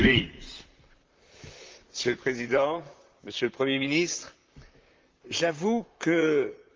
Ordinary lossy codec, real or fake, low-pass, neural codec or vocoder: Opus, 24 kbps; fake; 7.2 kHz; vocoder, 44.1 kHz, 128 mel bands, Pupu-Vocoder